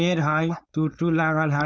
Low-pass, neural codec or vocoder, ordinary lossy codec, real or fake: none; codec, 16 kHz, 4.8 kbps, FACodec; none; fake